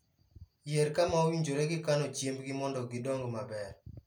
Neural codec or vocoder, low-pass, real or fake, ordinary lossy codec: none; 19.8 kHz; real; none